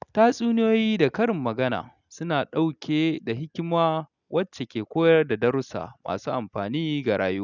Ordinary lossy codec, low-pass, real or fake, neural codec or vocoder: none; 7.2 kHz; real; none